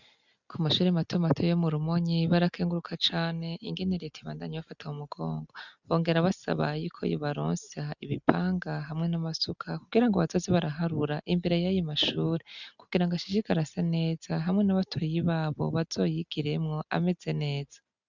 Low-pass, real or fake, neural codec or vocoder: 7.2 kHz; real; none